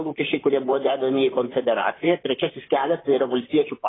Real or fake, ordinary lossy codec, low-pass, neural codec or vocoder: fake; AAC, 16 kbps; 7.2 kHz; vocoder, 44.1 kHz, 128 mel bands, Pupu-Vocoder